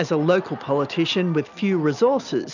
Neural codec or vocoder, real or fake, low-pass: none; real; 7.2 kHz